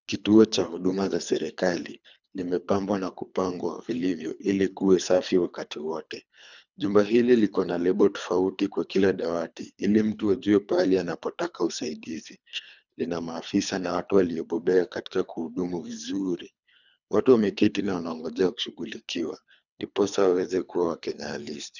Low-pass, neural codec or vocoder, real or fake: 7.2 kHz; codec, 24 kHz, 3 kbps, HILCodec; fake